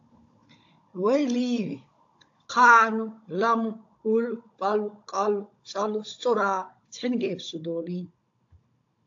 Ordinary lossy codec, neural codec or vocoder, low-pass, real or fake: AAC, 48 kbps; codec, 16 kHz, 16 kbps, FunCodec, trained on Chinese and English, 50 frames a second; 7.2 kHz; fake